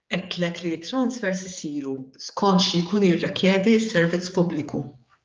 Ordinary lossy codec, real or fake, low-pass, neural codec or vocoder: Opus, 24 kbps; fake; 7.2 kHz; codec, 16 kHz, 4 kbps, X-Codec, HuBERT features, trained on general audio